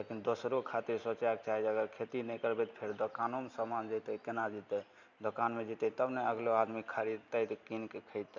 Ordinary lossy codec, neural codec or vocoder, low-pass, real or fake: none; none; 7.2 kHz; real